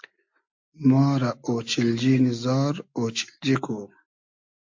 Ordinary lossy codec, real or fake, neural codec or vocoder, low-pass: MP3, 48 kbps; real; none; 7.2 kHz